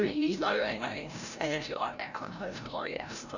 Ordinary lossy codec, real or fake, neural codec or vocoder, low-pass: none; fake; codec, 16 kHz, 0.5 kbps, FreqCodec, larger model; 7.2 kHz